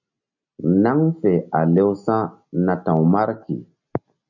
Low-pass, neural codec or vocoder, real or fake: 7.2 kHz; none; real